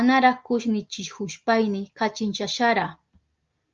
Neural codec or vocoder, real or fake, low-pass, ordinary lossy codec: none; real; 7.2 kHz; Opus, 32 kbps